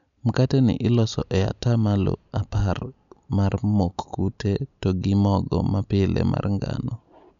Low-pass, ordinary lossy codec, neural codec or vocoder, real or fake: 7.2 kHz; none; none; real